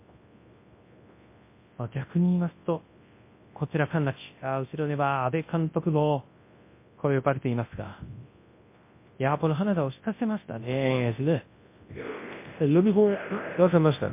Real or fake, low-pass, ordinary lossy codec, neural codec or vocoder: fake; 3.6 kHz; MP3, 24 kbps; codec, 24 kHz, 0.9 kbps, WavTokenizer, large speech release